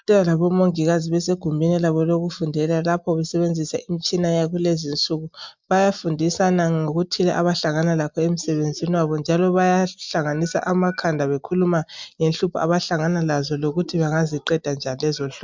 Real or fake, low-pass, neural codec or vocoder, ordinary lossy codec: real; 7.2 kHz; none; MP3, 64 kbps